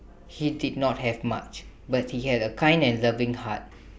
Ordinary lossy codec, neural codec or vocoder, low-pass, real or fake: none; none; none; real